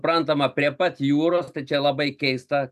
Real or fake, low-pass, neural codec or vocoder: real; 14.4 kHz; none